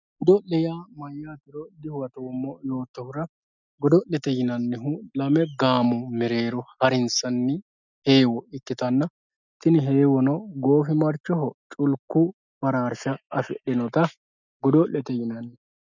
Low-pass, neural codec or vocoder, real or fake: 7.2 kHz; none; real